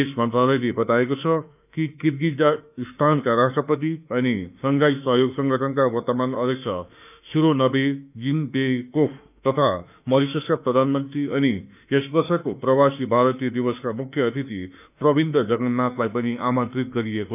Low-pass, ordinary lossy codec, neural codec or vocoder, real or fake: 3.6 kHz; none; autoencoder, 48 kHz, 32 numbers a frame, DAC-VAE, trained on Japanese speech; fake